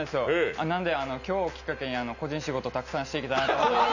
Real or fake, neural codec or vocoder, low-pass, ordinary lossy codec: real; none; 7.2 kHz; none